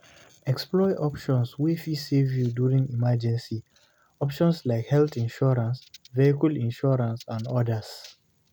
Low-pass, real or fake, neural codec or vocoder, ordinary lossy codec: none; real; none; none